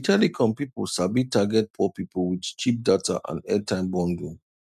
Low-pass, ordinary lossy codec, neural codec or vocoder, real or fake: 14.4 kHz; none; none; real